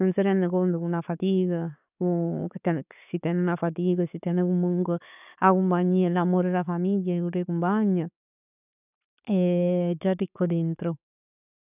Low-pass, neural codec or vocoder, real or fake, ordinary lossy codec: 3.6 kHz; none; real; none